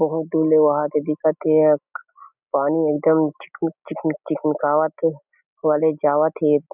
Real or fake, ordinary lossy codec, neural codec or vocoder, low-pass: real; none; none; 3.6 kHz